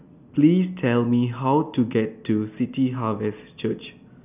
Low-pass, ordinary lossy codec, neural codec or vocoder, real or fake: 3.6 kHz; none; none; real